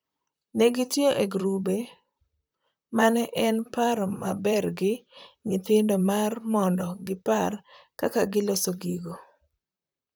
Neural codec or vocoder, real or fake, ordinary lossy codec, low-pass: vocoder, 44.1 kHz, 128 mel bands, Pupu-Vocoder; fake; none; none